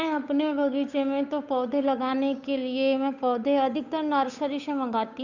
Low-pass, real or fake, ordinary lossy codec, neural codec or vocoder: 7.2 kHz; fake; none; codec, 16 kHz, 8 kbps, FunCodec, trained on Chinese and English, 25 frames a second